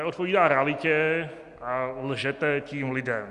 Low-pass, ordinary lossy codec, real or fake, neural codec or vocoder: 10.8 kHz; Opus, 64 kbps; real; none